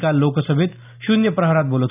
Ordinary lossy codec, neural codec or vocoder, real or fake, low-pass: none; none; real; 3.6 kHz